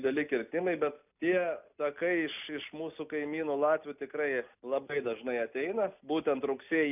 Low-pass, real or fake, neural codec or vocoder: 3.6 kHz; real; none